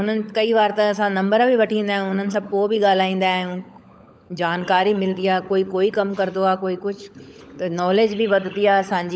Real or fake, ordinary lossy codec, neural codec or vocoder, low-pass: fake; none; codec, 16 kHz, 16 kbps, FunCodec, trained on LibriTTS, 50 frames a second; none